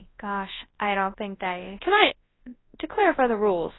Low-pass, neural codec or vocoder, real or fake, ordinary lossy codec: 7.2 kHz; codec, 24 kHz, 0.9 kbps, WavTokenizer, large speech release; fake; AAC, 16 kbps